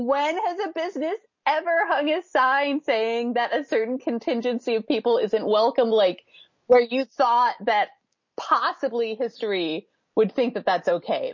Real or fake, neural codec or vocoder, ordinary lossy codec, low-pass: real; none; MP3, 32 kbps; 7.2 kHz